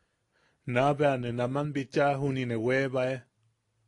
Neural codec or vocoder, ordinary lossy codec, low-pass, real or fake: none; AAC, 48 kbps; 10.8 kHz; real